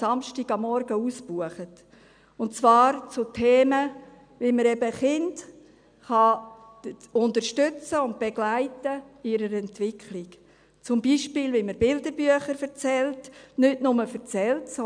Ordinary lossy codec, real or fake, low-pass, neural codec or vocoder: none; real; 9.9 kHz; none